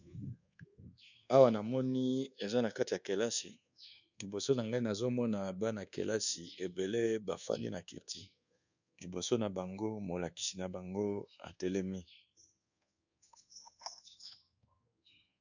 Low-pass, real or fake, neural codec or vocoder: 7.2 kHz; fake; codec, 24 kHz, 1.2 kbps, DualCodec